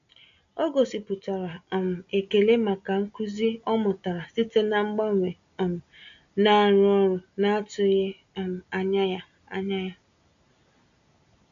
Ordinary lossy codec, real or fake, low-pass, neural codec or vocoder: MP3, 64 kbps; real; 7.2 kHz; none